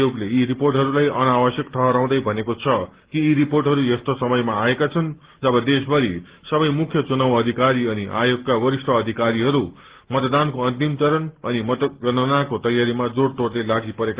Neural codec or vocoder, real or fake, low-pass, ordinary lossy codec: none; real; 3.6 kHz; Opus, 16 kbps